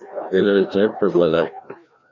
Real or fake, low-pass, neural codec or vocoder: fake; 7.2 kHz; codec, 16 kHz, 1 kbps, FreqCodec, larger model